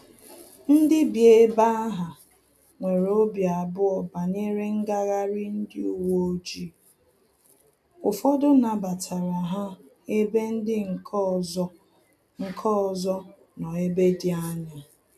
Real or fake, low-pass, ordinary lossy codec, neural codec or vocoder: real; 14.4 kHz; none; none